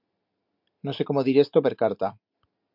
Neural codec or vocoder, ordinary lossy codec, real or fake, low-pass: none; MP3, 48 kbps; real; 5.4 kHz